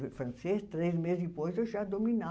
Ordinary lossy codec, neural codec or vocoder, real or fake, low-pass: none; none; real; none